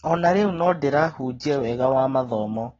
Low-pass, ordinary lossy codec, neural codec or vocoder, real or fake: 7.2 kHz; AAC, 24 kbps; none; real